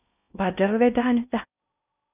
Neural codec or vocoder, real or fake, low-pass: codec, 16 kHz in and 24 kHz out, 0.6 kbps, FocalCodec, streaming, 4096 codes; fake; 3.6 kHz